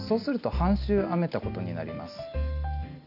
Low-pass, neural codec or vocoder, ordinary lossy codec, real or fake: 5.4 kHz; none; none; real